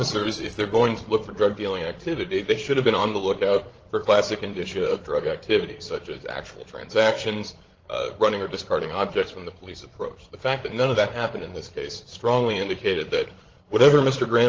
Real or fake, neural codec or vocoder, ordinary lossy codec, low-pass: fake; codec, 16 kHz, 16 kbps, FreqCodec, larger model; Opus, 16 kbps; 7.2 kHz